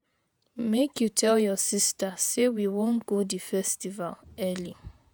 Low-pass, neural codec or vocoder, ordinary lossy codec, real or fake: none; vocoder, 48 kHz, 128 mel bands, Vocos; none; fake